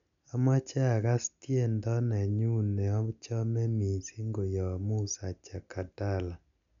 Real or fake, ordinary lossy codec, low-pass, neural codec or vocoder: real; MP3, 96 kbps; 7.2 kHz; none